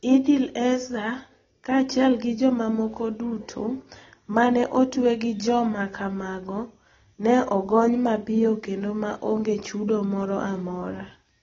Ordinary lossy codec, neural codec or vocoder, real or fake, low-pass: AAC, 24 kbps; none; real; 7.2 kHz